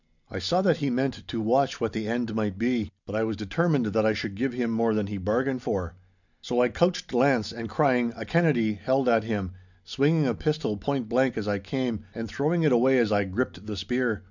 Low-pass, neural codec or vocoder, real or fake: 7.2 kHz; none; real